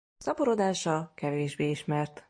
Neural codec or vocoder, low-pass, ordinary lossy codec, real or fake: none; 9.9 kHz; MP3, 48 kbps; real